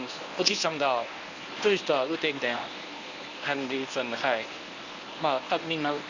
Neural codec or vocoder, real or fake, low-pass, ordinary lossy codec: codec, 24 kHz, 0.9 kbps, WavTokenizer, medium speech release version 1; fake; 7.2 kHz; none